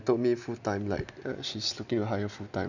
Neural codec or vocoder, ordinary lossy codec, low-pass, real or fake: none; none; 7.2 kHz; real